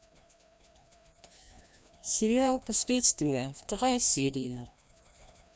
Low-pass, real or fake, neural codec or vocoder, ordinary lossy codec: none; fake; codec, 16 kHz, 1 kbps, FreqCodec, larger model; none